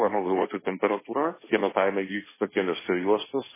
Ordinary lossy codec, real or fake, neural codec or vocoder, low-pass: MP3, 16 kbps; fake; codec, 16 kHz, 1.1 kbps, Voila-Tokenizer; 3.6 kHz